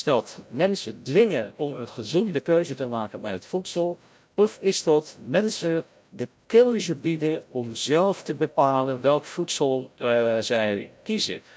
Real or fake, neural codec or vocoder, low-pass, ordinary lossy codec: fake; codec, 16 kHz, 0.5 kbps, FreqCodec, larger model; none; none